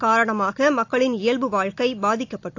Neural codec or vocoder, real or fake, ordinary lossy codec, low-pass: none; real; AAC, 48 kbps; 7.2 kHz